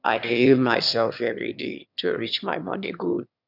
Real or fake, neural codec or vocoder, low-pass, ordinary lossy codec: fake; autoencoder, 22.05 kHz, a latent of 192 numbers a frame, VITS, trained on one speaker; 5.4 kHz; none